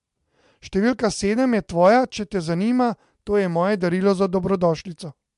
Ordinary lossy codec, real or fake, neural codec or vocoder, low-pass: MP3, 64 kbps; real; none; 10.8 kHz